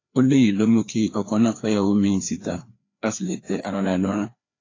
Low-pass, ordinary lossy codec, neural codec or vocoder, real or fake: 7.2 kHz; AAC, 32 kbps; codec, 16 kHz, 2 kbps, FreqCodec, larger model; fake